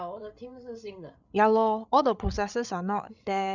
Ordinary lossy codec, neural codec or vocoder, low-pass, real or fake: none; codec, 16 kHz, 8 kbps, FreqCodec, larger model; 7.2 kHz; fake